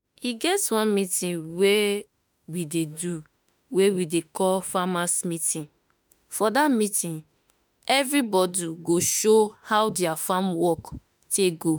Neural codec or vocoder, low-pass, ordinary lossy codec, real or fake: autoencoder, 48 kHz, 32 numbers a frame, DAC-VAE, trained on Japanese speech; none; none; fake